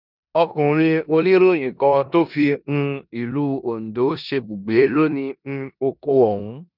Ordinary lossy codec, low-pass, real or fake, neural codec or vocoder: none; 5.4 kHz; fake; codec, 16 kHz in and 24 kHz out, 0.9 kbps, LongCat-Audio-Codec, four codebook decoder